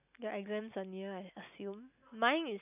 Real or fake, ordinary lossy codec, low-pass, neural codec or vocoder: real; none; 3.6 kHz; none